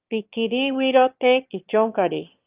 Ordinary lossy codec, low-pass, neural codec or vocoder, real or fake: Opus, 24 kbps; 3.6 kHz; autoencoder, 22.05 kHz, a latent of 192 numbers a frame, VITS, trained on one speaker; fake